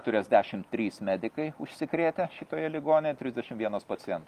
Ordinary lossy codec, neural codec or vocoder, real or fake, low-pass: Opus, 32 kbps; none; real; 14.4 kHz